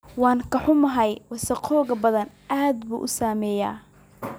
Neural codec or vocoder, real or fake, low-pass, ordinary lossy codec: none; real; none; none